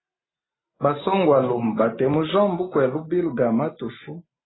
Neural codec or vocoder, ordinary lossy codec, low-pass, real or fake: none; AAC, 16 kbps; 7.2 kHz; real